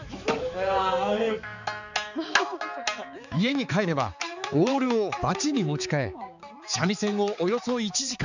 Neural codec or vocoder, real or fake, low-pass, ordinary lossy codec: codec, 16 kHz, 4 kbps, X-Codec, HuBERT features, trained on balanced general audio; fake; 7.2 kHz; none